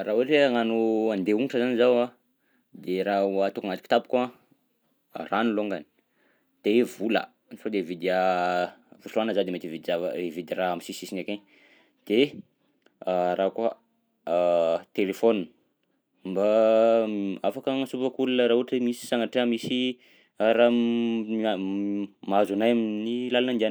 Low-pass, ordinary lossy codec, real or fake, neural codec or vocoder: none; none; real; none